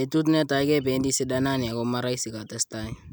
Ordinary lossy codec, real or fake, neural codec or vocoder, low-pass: none; real; none; none